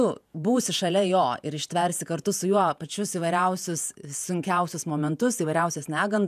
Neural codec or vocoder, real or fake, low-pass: vocoder, 48 kHz, 128 mel bands, Vocos; fake; 14.4 kHz